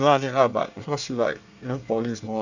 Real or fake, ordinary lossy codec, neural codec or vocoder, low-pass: fake; none; codec, 24 kHz, 1 kbps, SNAC; 7.2 kHz